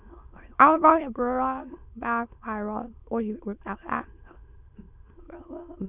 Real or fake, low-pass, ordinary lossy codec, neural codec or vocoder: fake; 3.6 kHz; none; autoencoder, 22.05 kHz, a latent of 192 numbers a frame, VITS, trained on many speakers